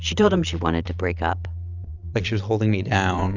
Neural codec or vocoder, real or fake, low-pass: vocoder, 22.05 kHz, 80 mel bands, Vocos; fake; 7.2 kHz